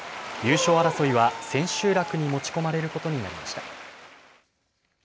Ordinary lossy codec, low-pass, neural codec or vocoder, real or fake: none; none; none; real